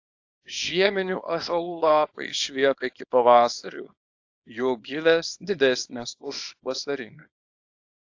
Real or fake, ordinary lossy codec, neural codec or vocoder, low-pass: fake; AAC, 48 kbps; codec, 24 kHz, 0.9 kbps, WavTokenizer, small release; 7.2 kHz